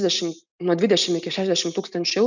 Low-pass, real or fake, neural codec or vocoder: 7.2 kHz; real; none